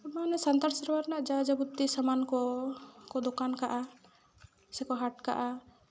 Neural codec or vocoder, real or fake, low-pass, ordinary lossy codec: none; real; none; none